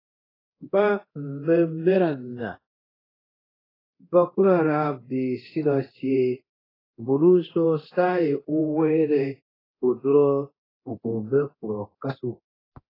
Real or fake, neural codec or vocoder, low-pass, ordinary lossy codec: fake; codec, 24 kHz, 0.9 kbps, DualCodec; 5.4 kHz; AAC, 24 kbps